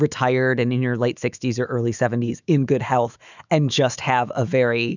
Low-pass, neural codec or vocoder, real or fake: 7.2 kHz; autoencoder, 48 kHz, 128 numbers a frame, DAC-VAE, trained on Japanese speech; fake